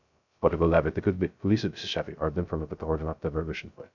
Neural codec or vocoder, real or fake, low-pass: codec, 16 kHz, 0.2 kbps, FocalCodec; fake; 7.2 kHz